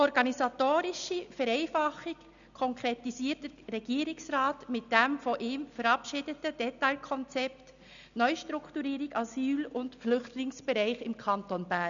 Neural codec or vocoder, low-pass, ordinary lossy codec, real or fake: none; 7.2 kHz; none; real